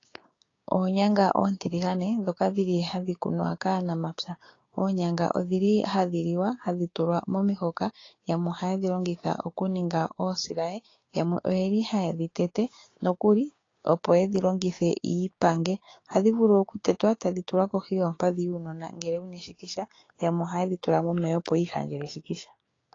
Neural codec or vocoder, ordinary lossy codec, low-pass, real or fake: codec, 16 kHz, 6 kbps, DAC; AAC, 32 kbps; 7.2 kHz; fake